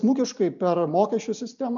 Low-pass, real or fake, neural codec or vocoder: 7.2 kHz; real; none